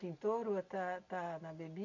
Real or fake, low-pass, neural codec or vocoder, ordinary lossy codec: real; 7.2 kHz; none; MP3, 48 kbps